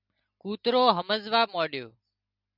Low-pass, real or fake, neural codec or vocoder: 5.4 kHz; real; none